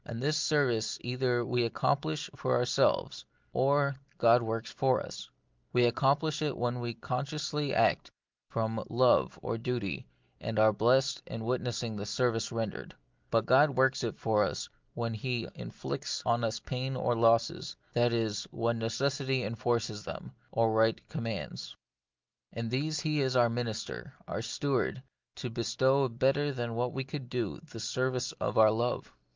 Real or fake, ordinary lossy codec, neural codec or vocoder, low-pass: real; Opus, 24 kbps; none; 7.2 kHz